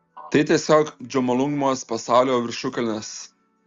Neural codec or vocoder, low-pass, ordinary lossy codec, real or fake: none; 7.2 kHz; Opus, 32 kbps; real